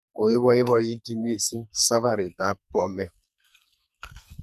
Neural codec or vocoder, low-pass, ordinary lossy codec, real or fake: codec, 44.1 kHz, 2.6 kbps, SNAC; 14.4 kHz; none; fake